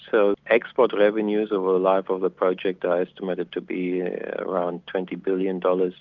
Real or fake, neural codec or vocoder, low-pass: real; none; 7.2 kHz